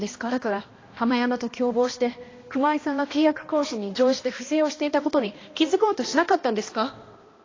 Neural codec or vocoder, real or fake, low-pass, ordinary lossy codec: codec, 16 kHz, 1 kbps, X-Codec, HuBERT features, trained on balanced general audio; fake; 7.2 kHz; AAC, 32 kbps